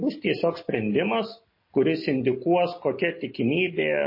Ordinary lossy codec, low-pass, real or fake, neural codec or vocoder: MP3, 24 kbps; 5.4 kHz; real; none